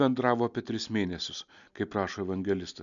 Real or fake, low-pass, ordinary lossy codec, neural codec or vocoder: real; 7.2 kHz; MP3, 96 kbps; none